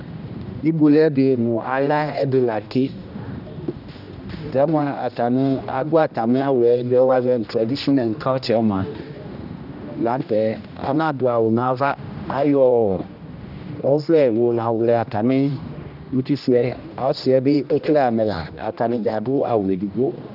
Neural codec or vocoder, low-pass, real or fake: codec, 16 kHz, 1 kbps, X-Codec, HuBERT features, trained on general audio; 5.4 kHz; fake